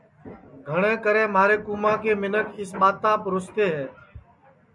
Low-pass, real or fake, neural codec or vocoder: 10.8 kHz; real; none